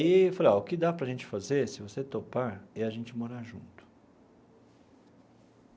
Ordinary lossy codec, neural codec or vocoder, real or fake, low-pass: none; none; real; none